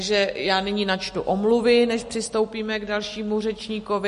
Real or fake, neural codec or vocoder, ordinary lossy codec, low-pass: real; none; MP3, 48 kbps; 14.4 kHz